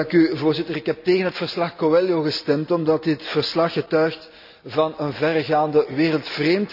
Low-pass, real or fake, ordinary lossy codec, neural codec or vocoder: 5.4 kHz; real; none; none